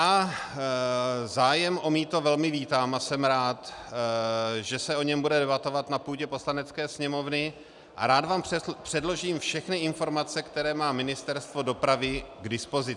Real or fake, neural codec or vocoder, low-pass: real; none; 10.8 kHz